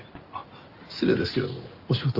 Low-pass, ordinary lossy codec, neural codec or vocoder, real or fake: 5.4 kHz; Opus, 24 kbps; none; real